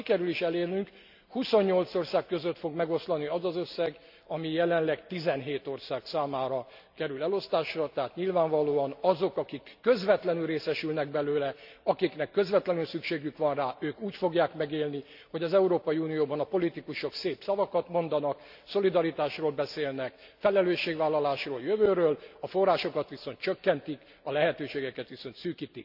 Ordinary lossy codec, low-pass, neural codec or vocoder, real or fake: none; 5.4 kHz; none; real